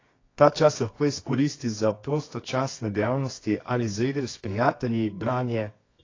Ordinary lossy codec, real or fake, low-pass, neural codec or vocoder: AAC, 32 kbps; fake; 7.2 kHz; codec, 24 kHz, 0.9 kbps, WavTokenizer, medium music audio release